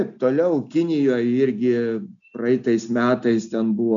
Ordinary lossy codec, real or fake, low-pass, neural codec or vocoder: MP3, 96 kbps; real; 7.2 kHz; none